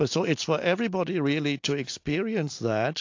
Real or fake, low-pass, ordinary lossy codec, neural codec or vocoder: real; 7.2 kHz; AAC, 48 kbps; none